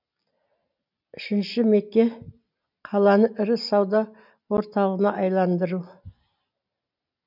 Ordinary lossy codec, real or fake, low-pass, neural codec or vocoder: none; real; 5.4 kHz; none